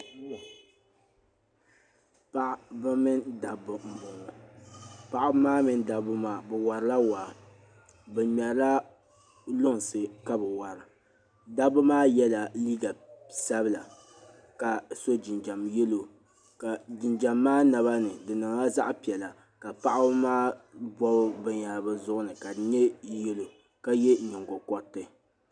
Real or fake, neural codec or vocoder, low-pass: real; none; 9.9 kHz